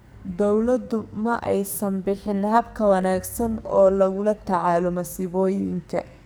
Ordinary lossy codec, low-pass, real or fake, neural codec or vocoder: none; none; fake; codec, 44.1 kHz, 2.6 kbps, SNAC